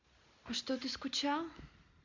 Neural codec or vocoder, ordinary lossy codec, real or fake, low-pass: none; MP3, 64 kbps; real; 7.2 kHz